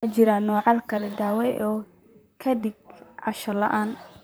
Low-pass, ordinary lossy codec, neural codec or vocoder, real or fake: none; none; vocoder, 44.1 kHz, 128 mel bands, Pupu-Vocoder; fake